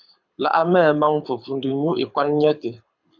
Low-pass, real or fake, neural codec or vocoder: 7.2 kHz; fake; codec, 24 kHz, 6 kbps, HILCodec